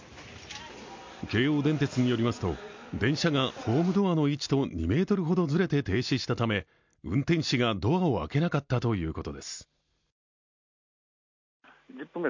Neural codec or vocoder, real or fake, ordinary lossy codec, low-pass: none; real; MP3, 64 kbps; 7.2 kHz